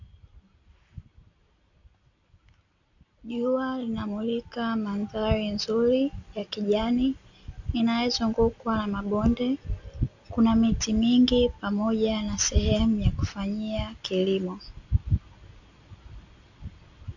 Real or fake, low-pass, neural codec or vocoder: real; 7.2 kHz; none